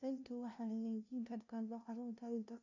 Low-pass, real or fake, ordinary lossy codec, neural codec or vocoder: 7.2 kHz; fake; none; codec, 16 kHz, 0.5 kbps, FunCodec, trained on LibriTTS, 25 frames a second